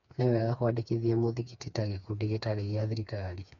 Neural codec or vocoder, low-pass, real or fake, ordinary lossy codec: codec, 16 kHz, 4 kbps, FreqCodec, smaller model; 7.2 kHz; fake; none